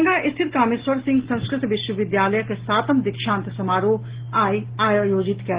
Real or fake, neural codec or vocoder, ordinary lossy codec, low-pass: real; none; Opus, 16 kbps; 3.6 kHz